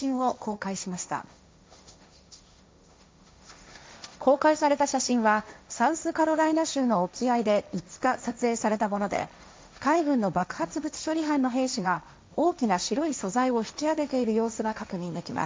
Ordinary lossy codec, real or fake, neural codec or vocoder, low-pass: none; fake; codec, 16 kHz, 1.1 kbps, Voila-Tokenizer; none